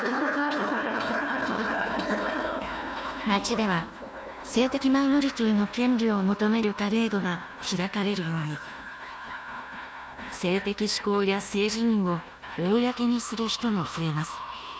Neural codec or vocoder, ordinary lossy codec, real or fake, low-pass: codec, 16 kHz, 1 kbps, FunCodec, trained on Chinese and English, 50 frames a second; none; fake; none